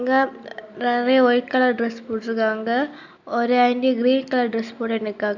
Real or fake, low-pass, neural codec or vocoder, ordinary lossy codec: real; 7.2 kHz; none; none